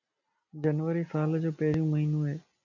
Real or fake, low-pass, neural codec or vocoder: real; 7.2 kHz; none